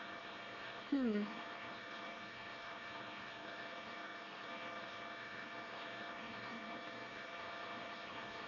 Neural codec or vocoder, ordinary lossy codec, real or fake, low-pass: codec, 24 kHz, 1 kbps, SNAC; none; fake; 7.2 kHz